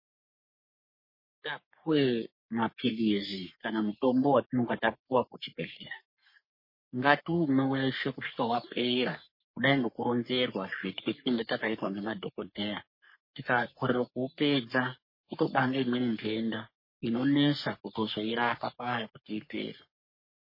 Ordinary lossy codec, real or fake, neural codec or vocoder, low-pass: MP3, 24 kbps; fake; codec, 44.1 kHz, 3.4 kbps, Pupu-Codec; 5.4 kHz